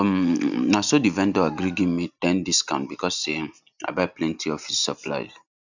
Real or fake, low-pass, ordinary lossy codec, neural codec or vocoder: real; 7.2 kHz; none; none